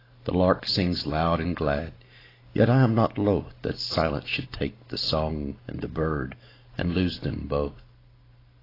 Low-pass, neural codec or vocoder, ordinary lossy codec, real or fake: 5.4 kHz; none; AAC, 24 kbps; real